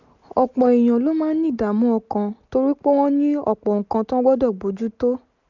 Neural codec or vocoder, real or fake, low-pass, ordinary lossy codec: none; real; 7.2 kHz; none